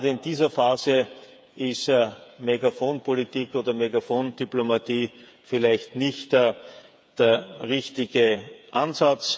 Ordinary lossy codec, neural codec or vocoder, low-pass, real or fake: none; codec, 16 kHz, 8 kbps, FreqCodec, smaller model; none; fake